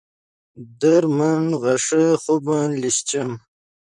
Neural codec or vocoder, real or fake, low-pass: vocoder, 44.1 kHz, 128 mel bands, Pupu-Vocoder; fake; 10.8 kHz